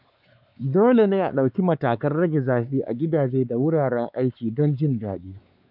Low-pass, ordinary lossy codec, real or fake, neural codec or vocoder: 5.4 kHz; none; fake; codec, 16 kHz, 4 kbps, X-Codec, WavLM features, trained on Multilingual LibriSpeech